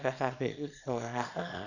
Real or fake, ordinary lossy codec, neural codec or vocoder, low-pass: fake; none; codec, 24 kHz, 0.9 kbps, WavTokenizer, small release; 7.2 kHz